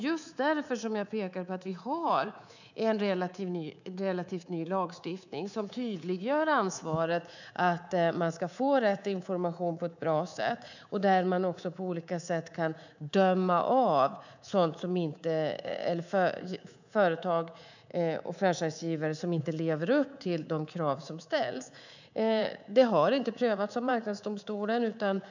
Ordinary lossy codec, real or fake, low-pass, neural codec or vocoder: none; fake; 7.2 kHz; codec, 24 kHz, 3.1 kbps, DualCodec